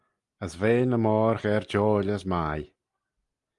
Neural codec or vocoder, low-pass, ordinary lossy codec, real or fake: none; 10.8 kHz; Opus, 32 kbps; real